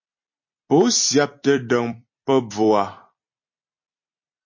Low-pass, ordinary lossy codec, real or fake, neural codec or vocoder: 7.2 kHz; MP3, 32 kbps; fake; vocoder, 44.1 kHz, 128 mel bands every 256 samples, BigVGAN v2